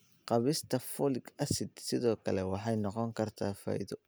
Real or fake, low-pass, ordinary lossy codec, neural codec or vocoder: real; none; none; none